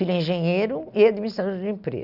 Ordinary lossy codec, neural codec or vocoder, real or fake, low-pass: none; none; real; 5.4 kHz